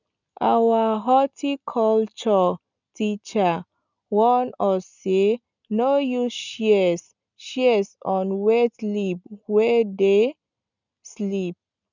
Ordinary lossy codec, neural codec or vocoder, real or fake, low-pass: none; none; real; 7.2 kHz